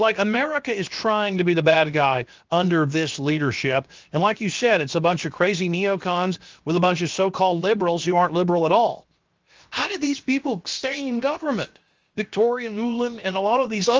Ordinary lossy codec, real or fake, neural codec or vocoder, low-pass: Opus, 32 kbps; fake; codec, 16 kHz, 0.7 kbps, FocalCodec; 7.2 kHz